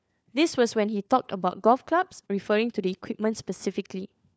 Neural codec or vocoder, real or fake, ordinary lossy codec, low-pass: codec, 16 kHz, 4 kbps, FunCodec, trained on LibriTTS, 50 frames a second; fake; none; none